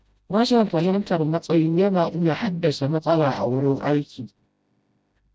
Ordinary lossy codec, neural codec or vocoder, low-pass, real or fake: none; codec, 16 kHz, 0.5 kbps, FreqCodec, smaller model; none; fake